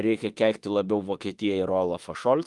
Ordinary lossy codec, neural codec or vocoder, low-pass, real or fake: Opus, 32 kbps; autoencoder, 48 kHz, 32 numbers a frame, DAC-VAE, trained on Japanese speech; 10.8 kHz; fake